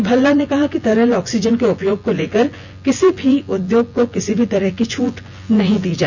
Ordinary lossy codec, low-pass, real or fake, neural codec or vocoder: none; 7.2 kHz; fake; vocoder, 24 kHz, 100 mel bands, Vocos